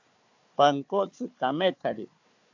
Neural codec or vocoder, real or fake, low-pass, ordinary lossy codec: codec, 16 kHz, 4 kbps, FunCodec, trained on Chinese and English, 50 frames a second; fake; 7.2 kHz; AAC, 48 kbps